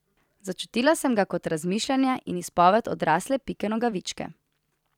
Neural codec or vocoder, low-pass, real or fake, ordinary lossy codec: vocoder, 44.1 kHz, 128 mel bands every 256 samples, BigVGAN v2; 19.8 kHz; fake; none